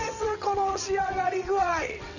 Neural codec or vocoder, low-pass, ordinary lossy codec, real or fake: vocoder, 22.05 kHz, 80 mel bands, WaveNeXt; 7.2 kHz; none; fake